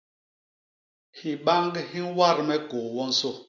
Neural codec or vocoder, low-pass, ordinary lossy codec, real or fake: none; 7.2 kHz; MP3, 64 kbps; real